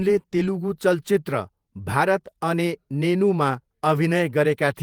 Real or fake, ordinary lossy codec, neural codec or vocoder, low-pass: fake; Opus, 32 kbps; vocoder, 48 kHz, 128 mel bands, Vocos; 19.8 kHz